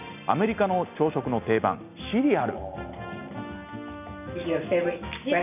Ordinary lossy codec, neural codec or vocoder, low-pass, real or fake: none; none; 3.6 kHz; real